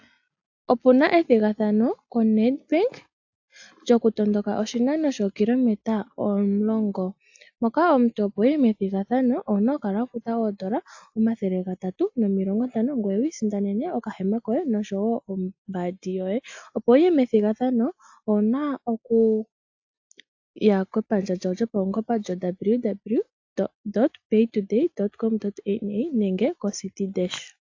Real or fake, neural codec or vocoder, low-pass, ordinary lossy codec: real; none; 7.2 kHz; AAC, 48 kbps